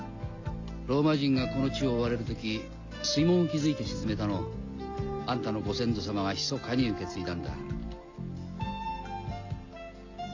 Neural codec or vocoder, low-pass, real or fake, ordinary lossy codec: none; 7.2 kHz; real; AAC, 48 kbps